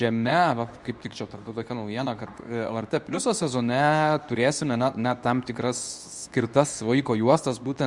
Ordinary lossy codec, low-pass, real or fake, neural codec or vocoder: Opus, 64 kbps; 10.8 kHz; fake; codec, 24 kHz, 0.9 kbps, WavTokenizer, medium speech release version 2